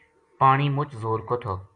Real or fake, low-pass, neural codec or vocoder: real; 9.9 kHz; none